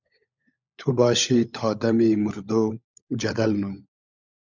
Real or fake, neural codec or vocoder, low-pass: fake; codec, 16 kHz, 16 kbps, FunCodec, trained on LibriTTS, 50 frames a second; 7.2 kHz